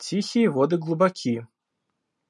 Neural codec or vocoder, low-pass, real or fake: none; 10.8 kHz; real